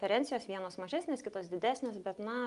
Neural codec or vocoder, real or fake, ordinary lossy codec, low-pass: none; real; Opus, 32 kbps; 10.8 kHz